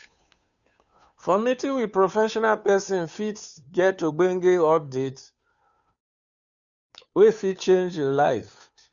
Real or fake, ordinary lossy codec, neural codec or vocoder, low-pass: fake; none; codec, 16 kHz, 2 kbps, FunCodec, trained on Chinese and English, 25 frames a second; 7.2 kHz